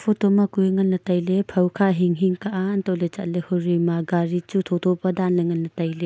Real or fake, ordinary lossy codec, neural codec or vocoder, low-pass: real; none; none; none